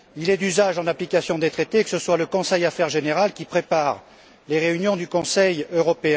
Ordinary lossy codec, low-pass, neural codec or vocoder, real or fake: none; none; none; real